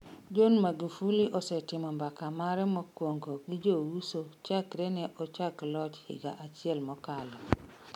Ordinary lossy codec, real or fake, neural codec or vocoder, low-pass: MP3, 96 kbps; real; none; 19.8 kHz